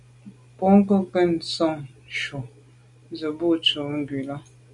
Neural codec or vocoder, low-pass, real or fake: none; 10.8 kHz; real